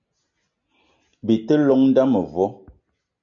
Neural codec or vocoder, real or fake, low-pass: none; real; 7.2 kHz